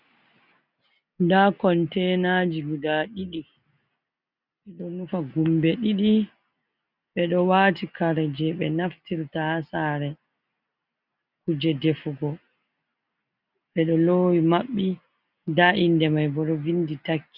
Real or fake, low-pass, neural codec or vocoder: real; 5.4 kHz; none